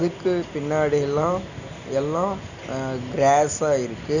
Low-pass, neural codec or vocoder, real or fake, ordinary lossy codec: 7.2 kHz; none; real; none